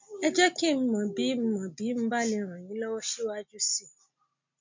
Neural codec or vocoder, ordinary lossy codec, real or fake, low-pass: none; MP3, 48 kbps; real; 7.2 kHz